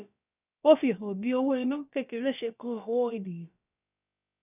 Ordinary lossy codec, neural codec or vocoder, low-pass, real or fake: none; codec, 16 kHz, about 1 kbps, DyCAST, with the encoder's durations; 3.6 kHz; fake